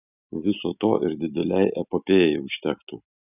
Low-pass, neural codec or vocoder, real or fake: 3.6 kHz; none; real